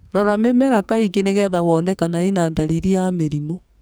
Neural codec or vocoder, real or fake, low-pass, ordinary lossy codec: codec, 44.1 kHz, 2.6 kbps, SNAC; fake; none; none